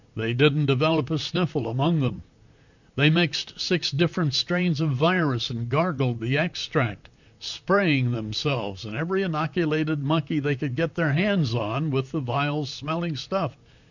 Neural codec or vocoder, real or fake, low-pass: vocoder, 44.1 kHz, 128 mel bands, Pupu-Vocoder; fake; 7.2 kHz